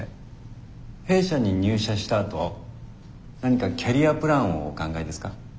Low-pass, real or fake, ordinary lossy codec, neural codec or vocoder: none; real; none; none